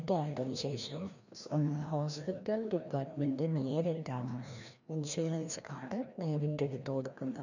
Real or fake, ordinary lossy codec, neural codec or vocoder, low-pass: fake; none; codec, 16 kHz, 1 kbps, FreqCodec, larger model; 7.2 kHz